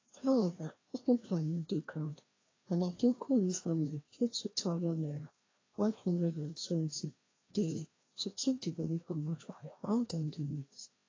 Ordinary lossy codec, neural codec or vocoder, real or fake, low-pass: AAC, 32 kbps; codec, 16 kHz, 1 kbps, FreqCodec, larger model; fake; 7.2 kHz